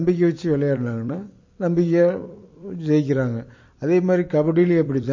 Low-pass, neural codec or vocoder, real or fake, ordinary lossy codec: 7.2 kHz; vocoder, 44.1 kHz, 128 mel bands every 256 samples, BigVGAN v2; fake; MP3, 32 kbps